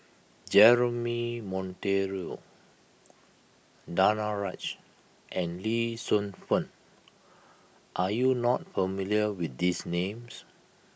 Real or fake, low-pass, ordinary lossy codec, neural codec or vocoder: real; none; none; none